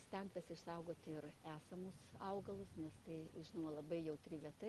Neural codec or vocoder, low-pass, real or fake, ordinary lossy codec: none; 14.4 kHz; real; Opus, 24 kbps